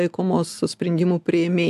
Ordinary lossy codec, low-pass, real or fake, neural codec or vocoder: AAC, 96 kbps; 14.4 kHz; real; none